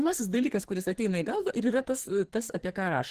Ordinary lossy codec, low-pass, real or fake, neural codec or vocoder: Opus, 16 kbps; 14.4 kHz; fake; codec, 44.1 kHz, 2.6 kbps, SNAC